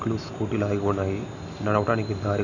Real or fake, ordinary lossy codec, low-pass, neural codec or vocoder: real; none; 7.2 kHz; none